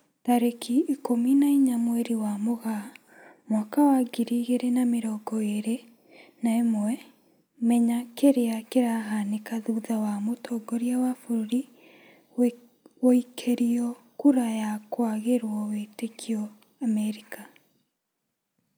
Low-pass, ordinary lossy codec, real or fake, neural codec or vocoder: none; none; real; none